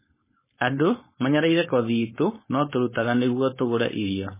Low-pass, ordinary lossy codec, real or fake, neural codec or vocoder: 3.6 kHz; MP3, 16 kbps; fake; codec, 16 kHz, 4.8 kbps, FACodec